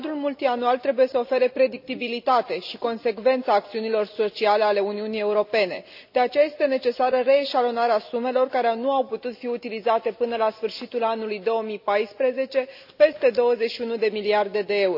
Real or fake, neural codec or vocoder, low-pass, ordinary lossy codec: real; none; 5.4 kHz; AAC, 48 kbps